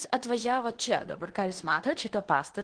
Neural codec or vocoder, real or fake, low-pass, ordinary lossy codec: codec, 16 kHz in and 24 kHz out, 0.9 kbps, LongCat-Audio-Codec, fine tuned four codebook decoder; fake; 9.9 kHz; Opus, 16 kbps